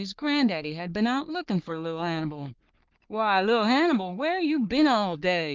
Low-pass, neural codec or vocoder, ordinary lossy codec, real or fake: 7.2 kHz; codec, 44.1 kHz, 3.4 kbps, Pupu-Codec; Opus, 32 kbps; fake